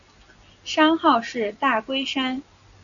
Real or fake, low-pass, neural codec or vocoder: real; 7.2 kHz; none